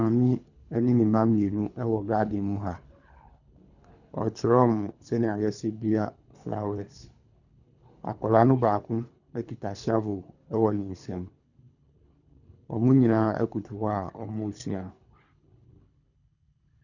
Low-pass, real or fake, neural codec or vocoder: 7.2 kHz; fake; codec, 24 kHz, 3 kbps, HILCodec